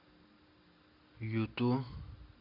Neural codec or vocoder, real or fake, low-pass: none; real; 5.4 kHz